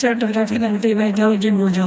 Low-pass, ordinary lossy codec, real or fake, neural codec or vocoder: none; none; fake; codec, 16 kHz, 1 kbps, FreqCodec, smaller model